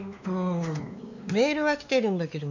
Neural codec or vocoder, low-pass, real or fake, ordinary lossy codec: codec, 16 kHz, 2 kbps, X-Codec, WavLM features, trained on Multilingual LibriSpeech; 7.2 kHz; fake; none